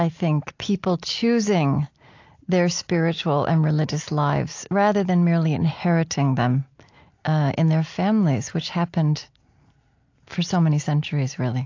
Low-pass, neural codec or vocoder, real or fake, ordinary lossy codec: 7.2 kHz; none; real; AAC, 48 kbps